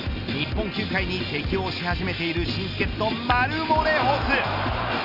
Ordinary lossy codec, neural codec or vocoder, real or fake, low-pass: none; none; real; 5.4 kHz